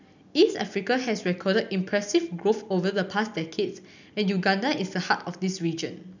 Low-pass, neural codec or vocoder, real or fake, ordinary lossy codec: 7.2 kHz; none; real; none